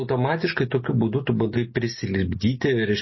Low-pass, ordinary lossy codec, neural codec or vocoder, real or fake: 7.2 kHz; MP3, 24 kbps; none; real